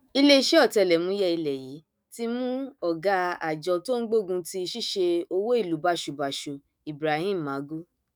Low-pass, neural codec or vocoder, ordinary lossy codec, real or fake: none; autoencoder, 48 kHz, 128 numbers a frame, DAC-VAE, trained on Japanese speech; none; fake